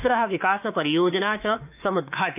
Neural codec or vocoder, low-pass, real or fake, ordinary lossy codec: autoencoder, 48 kHz, 32 numbers a frame, DAC-VAE, trained on Japanese speech; 3.6 kHz; fake; none